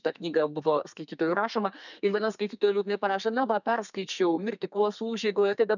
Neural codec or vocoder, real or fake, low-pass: codec, 32 kHz, 1.9 kbps, SNAC; fake; 7.2 kHz